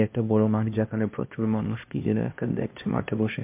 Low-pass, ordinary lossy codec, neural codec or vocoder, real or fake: 3.6 kHz; MP3, 32 kbps; codec, 16 kHz, 1 kbps, X-Codec, HuBERT features, trained on LibriSpeech; fake